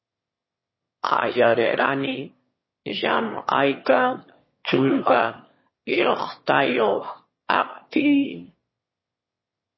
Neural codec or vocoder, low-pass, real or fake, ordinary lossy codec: autoencoder, 22.05 kHz, a latent of 192 numbers a frame, VITS, trained on one speaker; 7.2 kHz; fake; MP3, 24 kbps